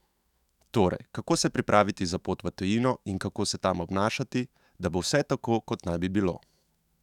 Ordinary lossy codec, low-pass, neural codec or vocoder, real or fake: none; 19.8 kHz; autoencoder, 48 kHz, 128 numbers a frame, DAC-VAE, trained on Japanese speech; fake